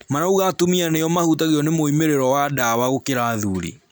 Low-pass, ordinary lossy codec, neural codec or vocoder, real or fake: none; none; none; real